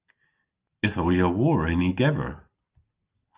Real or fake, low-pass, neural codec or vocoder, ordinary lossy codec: real; 3.6 kHz; none; Opus, 24 kbps